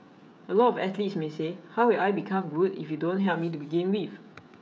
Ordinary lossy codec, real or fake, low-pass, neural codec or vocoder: none; fake; none; codec, 16 kHz, 16 kbps, FreqCodec, smaller model